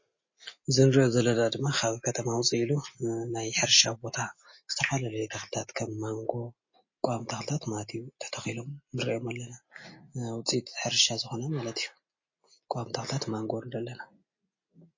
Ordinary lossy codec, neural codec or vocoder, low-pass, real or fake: MP3, 32 kbps; none; 7.2 kHz; real